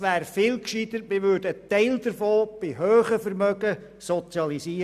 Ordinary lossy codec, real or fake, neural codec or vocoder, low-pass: none; real; none; 14.4 kHz